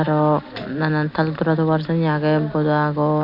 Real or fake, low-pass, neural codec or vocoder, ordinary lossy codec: real; 5.4 kHz; none; none